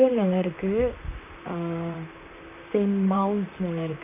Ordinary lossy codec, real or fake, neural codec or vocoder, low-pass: none; fake; vocoder, 44.1 kHz, 128 mel bands, Pupu-Vocoder; 3.6 kHz